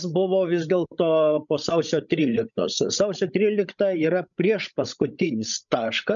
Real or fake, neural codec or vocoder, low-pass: fake; codec, 16 kHz, 16 kbps, FreqCodec, larger model; 7.2 kHz